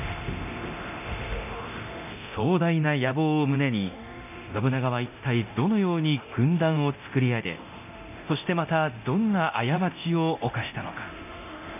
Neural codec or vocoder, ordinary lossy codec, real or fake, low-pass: codec, 24 kHz, 0.9 kbps, DualCodec; none; fake; 3.6 kHz